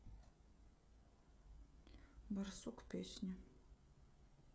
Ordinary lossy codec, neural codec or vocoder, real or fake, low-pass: none; codec, 16 kHz, 16 kbps, FreqCodec, smaller model; fake; none